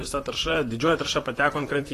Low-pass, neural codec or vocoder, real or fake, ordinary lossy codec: 14.4 kHz; vocoder, 44.1 kHz, 128 mel bands, Pupu-Vocoder; fake; AAC, 48 kbps